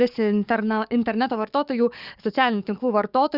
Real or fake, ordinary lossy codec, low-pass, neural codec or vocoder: fake; Opus, 64 kbps; 5.4 kHz; codec, 44.1 kHz, 7.8 kbps, DAC